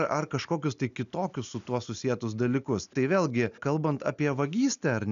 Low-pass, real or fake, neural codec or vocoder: 7.2 kHz; real; none